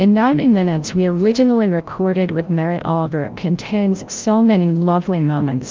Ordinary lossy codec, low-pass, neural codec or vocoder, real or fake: Opus, 32 kbps; 7.2 kHz; codec, 16 kHz, 0.5 kbps, FreqCodec, larger model; fake